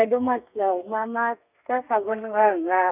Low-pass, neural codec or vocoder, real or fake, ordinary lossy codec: 3.6 kHz; codec, 32 kHz, 1.9 kbps, SNAC; fake; none